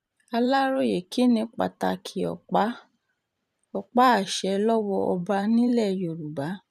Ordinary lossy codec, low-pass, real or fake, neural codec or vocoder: none; 14.4 kHz; fake; vocoder, 44.1 kHz, 128 mel bands every 512 samples, BigVGAN v2